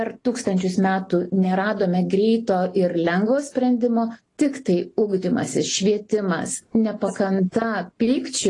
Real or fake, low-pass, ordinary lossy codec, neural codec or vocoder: real; 10.8 kHz; AAC, 32 kbps; none